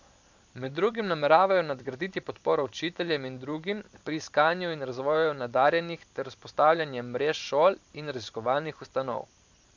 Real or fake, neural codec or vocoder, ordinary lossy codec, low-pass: fake; vocoder, 44.1 kHz, 128 mel bands every 512 samples, BigVGAN v2; MP3, 64 kbps; 7.2 kHz